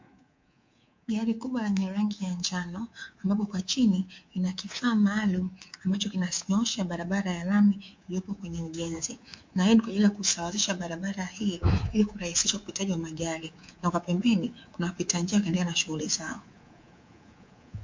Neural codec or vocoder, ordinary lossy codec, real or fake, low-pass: codec, 24 kHz, 3.1 kbps, DualCodec; MP3, 48 kbps; fake; 7.2 kHz